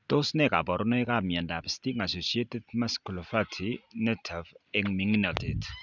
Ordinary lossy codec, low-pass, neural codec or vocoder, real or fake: none; 7.2 kHz; none; real